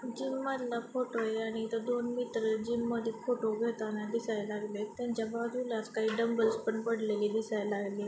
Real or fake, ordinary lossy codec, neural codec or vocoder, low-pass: real; none; none; none